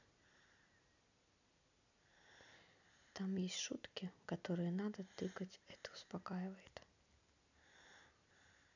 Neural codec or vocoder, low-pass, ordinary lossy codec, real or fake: none; 7.2 kHz; none; real